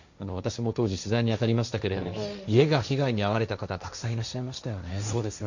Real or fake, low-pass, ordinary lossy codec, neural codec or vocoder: fake; 7.2 kHz; none; codec, 16 kHz, 1.1 kbps, Voila-Tokenizer